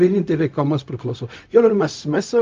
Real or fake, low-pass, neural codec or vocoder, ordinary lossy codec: fake; 7.2 kHz; codec, 16 kHz, 0.4 kbps, LongCat-Audio-Codec; Opus, 24 kbps